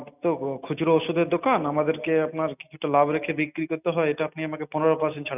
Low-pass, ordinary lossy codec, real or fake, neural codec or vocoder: 3.6 kHz; none; real; none